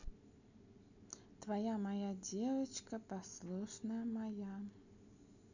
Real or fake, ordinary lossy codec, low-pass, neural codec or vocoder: real; none; 7.2 kHz; none